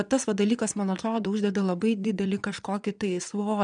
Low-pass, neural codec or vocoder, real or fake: 9.9 kHz; vocoder, 22.05 kHz, 80 mel bands, Vocos; fake